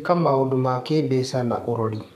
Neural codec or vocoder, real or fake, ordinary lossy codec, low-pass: codec, 32 kHz, 1.9 kbps, SNAC; fake; none; 14.4 kHz